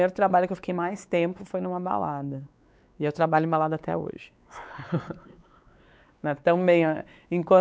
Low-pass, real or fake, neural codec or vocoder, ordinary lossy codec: none; fake; codec, 16 kHz, 4 kbps, X-Codec, WavLM features, trained on Multilingual LibriSpeech; none